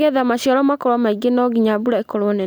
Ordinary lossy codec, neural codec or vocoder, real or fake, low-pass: none; none; real; none